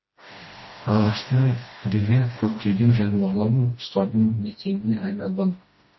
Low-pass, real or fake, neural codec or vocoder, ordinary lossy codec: 7.2 kHz; fake; codec, 16 kHz, 1 kbps, FreqCodec, smaller model; MP3, 24 kbps